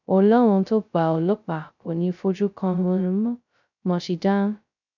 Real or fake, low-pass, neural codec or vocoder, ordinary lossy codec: fake; 7.2 kHz; codec, 16 kHz, 0.2 kbps, FocalCodec; none